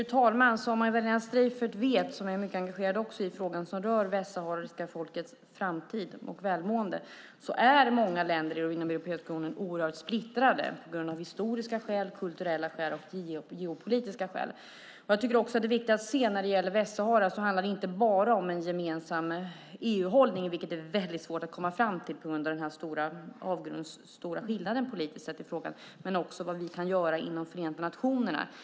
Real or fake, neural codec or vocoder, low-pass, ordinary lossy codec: real; none; none; none